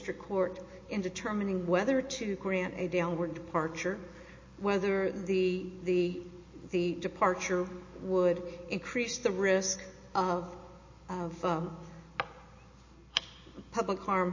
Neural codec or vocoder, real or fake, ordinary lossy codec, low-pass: none; real; MP3, 32 kbps; 7.2 kHz